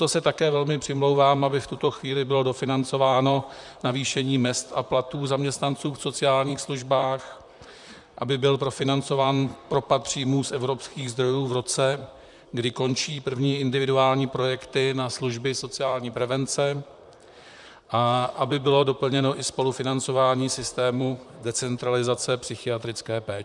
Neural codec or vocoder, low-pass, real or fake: vocoder, 44.1 kHz, 128 mel bands, Pupu-Vocoder; 10.8 kHz; fake